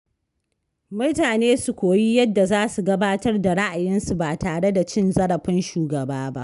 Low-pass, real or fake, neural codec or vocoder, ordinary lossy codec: 10.8 kHz; real; none; none